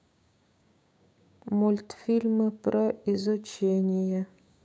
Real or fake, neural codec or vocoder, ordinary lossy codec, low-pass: fake; codec, 16 kHz, 6 kbps, DAC; none; none